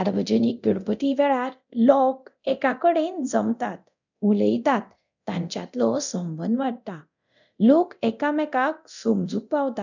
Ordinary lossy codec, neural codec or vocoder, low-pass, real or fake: none; codec, 24 kHz, 0.9 kbps, DualCodec; 7.2 kHz; fake